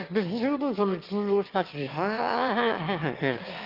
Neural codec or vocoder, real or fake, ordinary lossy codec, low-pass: autoencoder, 22.05 kHz, a latent of 192 numbers a frame, VITS, trained on one speaker; fake; Opus, 32 kbps; 5.4 kHz